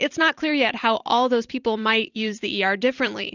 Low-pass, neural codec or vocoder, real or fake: 7.2 kHz; vocoder, 44.1 kHz, 128 mel bands every 256 samples, BigVGAN v2; fake